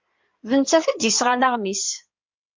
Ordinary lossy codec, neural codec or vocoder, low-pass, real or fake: MP3, 48 kbps; codec, 16 kHz in and 24 kHz out, 2.2 kbps, FireRedTTS-2 codec; 7.2 kHz; fake